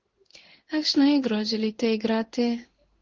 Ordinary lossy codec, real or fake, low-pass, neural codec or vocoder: Opus, 16 kbps; fake; 7.2 kHz; codec, 16 kHz in and 24 kHz out, 1 kbps, XY-Tokenizer